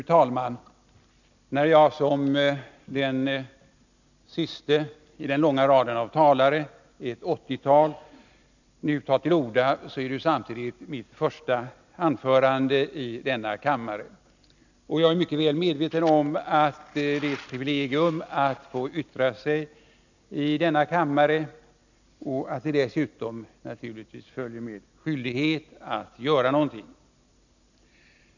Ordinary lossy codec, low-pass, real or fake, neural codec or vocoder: none; 7.2 kHz; real; none